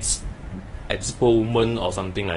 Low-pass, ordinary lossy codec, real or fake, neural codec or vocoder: 10.8 kHz; AAC, 32 kbps; fake; codec, 24 kHz, 0.9 kbps, WavTokenizer, medium speech release version 1